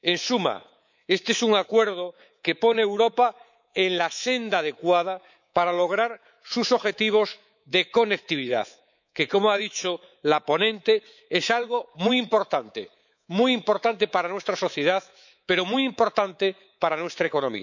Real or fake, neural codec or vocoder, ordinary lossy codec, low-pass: fake; codec, 24 kHz, 3.1 kbps, DualCodec; none; 7.2 kHz